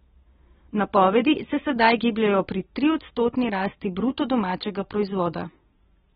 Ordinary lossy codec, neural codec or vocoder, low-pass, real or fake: AAC, 16 kbps; none; 19.8 kHz; real